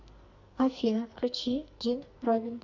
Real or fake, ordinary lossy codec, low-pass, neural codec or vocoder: fake; Opus, 64 kbps; 7.2 kHz; codec, 44.1 kHz, 2.6 kbps, SNAC